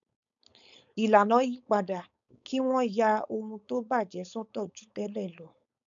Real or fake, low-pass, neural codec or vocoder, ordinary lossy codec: fake; 7.2 kHz; codec, 16 kHz, 4.8 kbps, FACodec; none